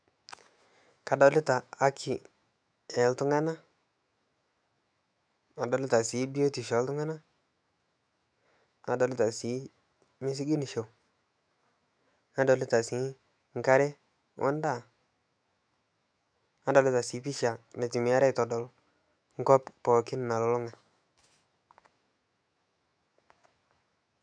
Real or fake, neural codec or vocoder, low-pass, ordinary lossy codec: fake; autoencoder, 48 kHz, 128 numbers a frame, DAC-VAE, trained on Japanese speech; 9.9 kHz; MP3, 96 kbps